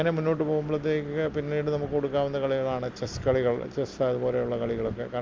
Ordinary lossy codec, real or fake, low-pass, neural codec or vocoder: Opus, 32 kbps; real; 7.2 kHz; none